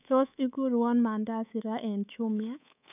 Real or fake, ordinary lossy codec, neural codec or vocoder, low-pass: fake; none; codec, 24 kHz, 3.1 kbps, DualCodec; 3.6 kHz